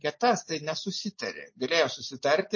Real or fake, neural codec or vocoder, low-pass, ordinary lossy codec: real; none; 7.2 kHz; MP3, 32 kbps